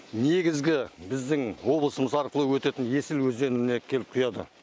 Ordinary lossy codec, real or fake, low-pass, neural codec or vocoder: none; real; none; none